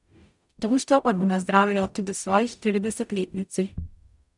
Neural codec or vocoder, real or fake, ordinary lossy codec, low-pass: codec, 44.1 kHz, 0.9 kbps, DAC; fake; none; 10.8 kHz